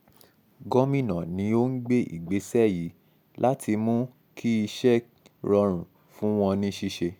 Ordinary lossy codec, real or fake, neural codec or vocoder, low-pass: none; real; none; 19.8 kHz